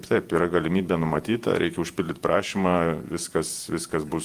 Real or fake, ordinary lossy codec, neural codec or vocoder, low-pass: fake; Opus, 32 kbps; vocoder, 48 kHz, 128 mel bands, Vocos; 14.4 kHz